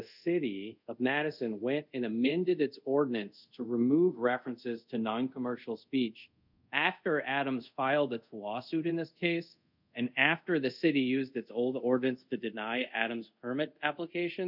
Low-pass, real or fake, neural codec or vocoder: 5.4 kHz; fake; codec, 24 kHz, 0.5 kbps, DualCodec